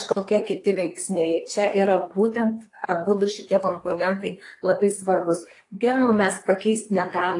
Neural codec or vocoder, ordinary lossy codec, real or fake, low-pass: codec, 24 kHz, 1 kbps, SNAC; AAC, 48 kbps; fake; 10.8 kHz